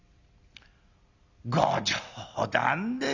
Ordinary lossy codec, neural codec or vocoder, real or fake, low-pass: Opus, 64 kbps; none; real; 7.2 kHz